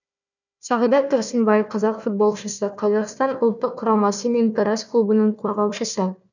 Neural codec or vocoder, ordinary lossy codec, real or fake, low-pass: codec, 16 kHz, 1 kbps, FunCodec, trained on Chinese and English, 50 frames a second; none; fake; 7.2 kHz